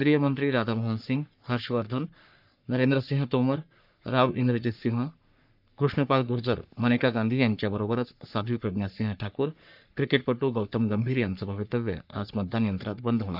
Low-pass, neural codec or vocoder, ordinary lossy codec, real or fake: 5.4 kHz; codec, 44.1 kHz, 3.4 kbps, Pupu-Codec; none; fake